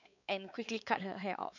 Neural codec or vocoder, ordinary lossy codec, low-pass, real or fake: codec, 16 kHz, 4 kbps, X-Codec, WavLM features, trained on Multilingual LibriSpeech; none; 7.2 kHz; fake